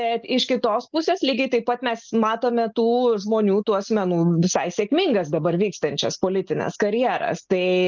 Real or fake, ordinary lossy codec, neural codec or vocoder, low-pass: real; Opus, 24 kbps; none; 7.2 kHz